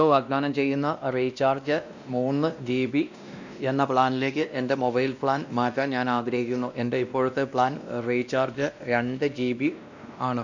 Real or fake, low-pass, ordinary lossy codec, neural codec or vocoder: fake; 7.2 kHz; none; codec, 16 kHz, 1 kbps, X-Codec, WavLM features, trained on Multilingual LibriSpeech